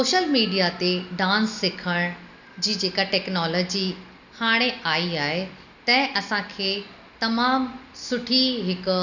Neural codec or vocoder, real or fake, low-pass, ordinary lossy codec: none; real; 7.2 kHz; none